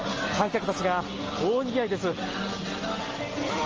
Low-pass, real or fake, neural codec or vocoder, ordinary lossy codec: 7.2 kHz; real; none; Opus, 24 kbps